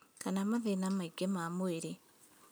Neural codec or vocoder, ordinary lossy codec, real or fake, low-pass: none; none; real; none